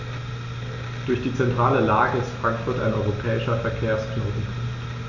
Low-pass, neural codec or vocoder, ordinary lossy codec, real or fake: 7.2 kHz; none; none; real